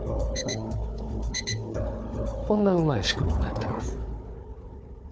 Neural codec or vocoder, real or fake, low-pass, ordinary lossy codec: codec, 16 kHz, 4 kbps, FunCodec, trained on Chinese and English, 50 frames a second; fake; none; none